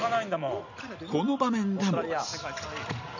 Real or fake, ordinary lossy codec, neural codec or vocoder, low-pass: real; none; none; 7.2 kHz